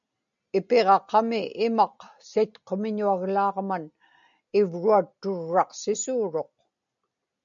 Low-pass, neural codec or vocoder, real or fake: 7.2 kHz; none; real